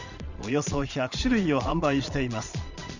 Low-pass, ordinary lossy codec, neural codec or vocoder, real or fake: 7.2 kHz; Opus, 64 kbps; vocoder, 22.05 kHz, 80 mel bands, Vocos; fake